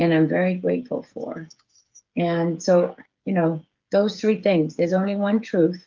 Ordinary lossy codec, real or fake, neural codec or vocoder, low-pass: Opus, 32 kbps; fake; codec, 16 kHz, 8 kbps, FreqCodec, smaller model; 7.2 kHz